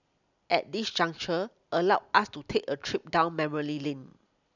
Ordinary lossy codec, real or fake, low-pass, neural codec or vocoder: none; real; 7.2 kHz; none